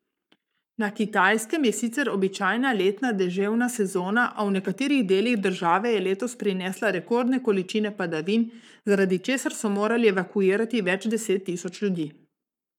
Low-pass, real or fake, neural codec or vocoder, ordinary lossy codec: 19.8 kHz; fake; codec, 44.1 kHz, 7.8 kbps, Pupu-Codec; none